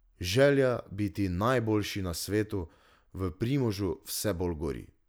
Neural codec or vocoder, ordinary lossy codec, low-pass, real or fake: none; none; none; real